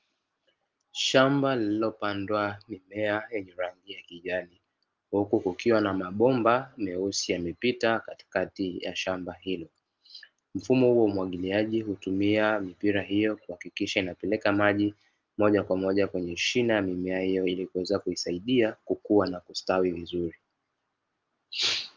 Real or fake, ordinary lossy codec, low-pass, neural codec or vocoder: real; Opus, 32 kbps; 7.2 kHz; none